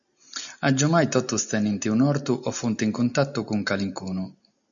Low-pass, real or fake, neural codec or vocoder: 7.2 kHz; real; none